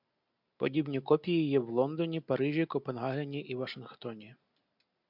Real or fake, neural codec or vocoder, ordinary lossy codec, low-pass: real; none; MP3, 48 kbps; 5.4 kHz